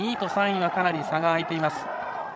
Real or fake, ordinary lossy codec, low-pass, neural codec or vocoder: fake; none; none; codec, 16 kHz, 16 kbps, FreqCodec, larger model